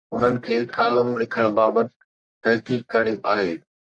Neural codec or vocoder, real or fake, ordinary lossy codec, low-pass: codec, 44.1 kHz, 1.7 kbps, Pupu-Codec; fake; AAC, 64 kbps; 9.9 kHz